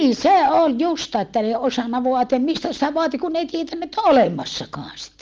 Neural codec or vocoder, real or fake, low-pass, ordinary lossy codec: none; real; 7.2 kHz; Opus, 32 kbps